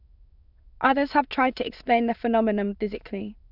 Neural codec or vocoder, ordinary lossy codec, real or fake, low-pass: autoencoder, 22.05 kHz, a latent of 192 numbers a frame, VITS, trained on many speakers; none; fake; 5.4 kHz